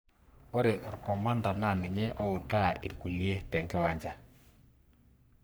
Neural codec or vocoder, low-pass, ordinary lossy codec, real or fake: codec, 44.1 kHz, 3.4 kbps, Pupu-Codec; none; none; fake